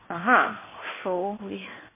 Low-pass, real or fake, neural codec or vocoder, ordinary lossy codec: 3.6 kHz; fake; codec, 16 kHz in and 24 kHz out, 1 kbps, XY-Tokenizer; MP3, 16 kbps